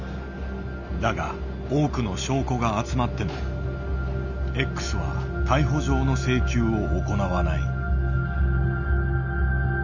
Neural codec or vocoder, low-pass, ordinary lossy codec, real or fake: none; 7.2 kHz; none; real